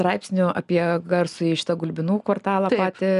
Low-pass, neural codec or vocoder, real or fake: 10.8 kHz; none; real